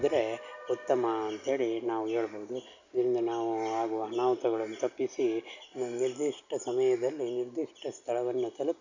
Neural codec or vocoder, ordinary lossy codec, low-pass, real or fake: none; none; 7.2 kHz; real